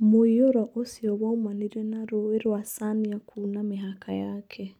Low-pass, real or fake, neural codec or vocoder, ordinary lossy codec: 19.8 kHz; real; none; none